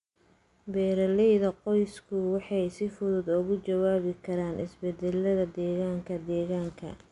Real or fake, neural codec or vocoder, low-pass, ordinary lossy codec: real; none; 10.8 kHz; AAC, 96 kbps